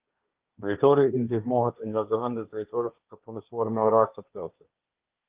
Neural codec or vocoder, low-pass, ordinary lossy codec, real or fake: codec, 16 kHz, 1.1 kbps, Voila-Tokenizer; 3.6 kHz; Opus, 32 kbps; fake